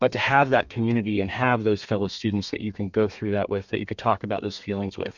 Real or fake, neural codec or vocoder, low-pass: fake; codec, 32 kHz, 1.9 kbps, SNAC; 7.2 kHz